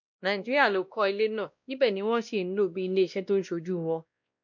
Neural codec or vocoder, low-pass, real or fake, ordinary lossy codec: codec, 16 kHz, 1 kbps, X-Codec, WavLM features, trained on Multilingual LibriSpeech; 7.2 kHz; fake; MP3, 64 kbps